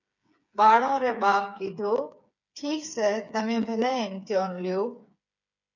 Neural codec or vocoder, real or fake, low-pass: codec, 16 kHz, 8 kbps, FreqCodec, smaller model; fake; 7.2 kHz